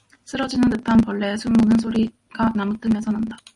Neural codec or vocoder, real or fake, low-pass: none; real; 10.8 kHz